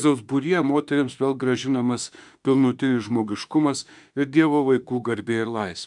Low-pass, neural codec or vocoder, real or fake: 10.8 kHz; autoencoder, 48 kHz, 32 numbers a frame, DAC-VAE, trained on Japanese speech; fake